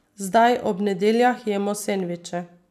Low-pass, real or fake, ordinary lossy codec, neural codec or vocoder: 14.4 kHz; fake; none; vocoder, 44.1 kHz, 128 mel bands every 256 samples, BigVGAN v2